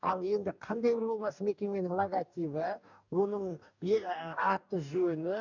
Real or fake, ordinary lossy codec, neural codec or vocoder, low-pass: fake; none; codec, 44.1 kHz, 2.6 kbps, DAC; 7.2 kHz